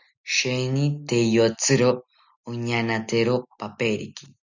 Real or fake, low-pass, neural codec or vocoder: real; 7.2 kHz; none